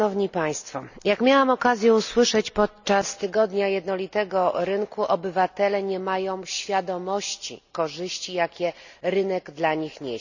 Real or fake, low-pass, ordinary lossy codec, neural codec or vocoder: real; 7.2 kHz; none; none